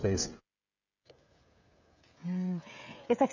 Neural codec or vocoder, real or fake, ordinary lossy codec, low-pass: codec, 16 kHz, 16 kbps, FreqCodec, smaller model; fake; none; 7.2 kHz